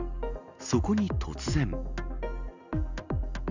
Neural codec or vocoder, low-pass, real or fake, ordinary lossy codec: none; 7.2 kHz; real; none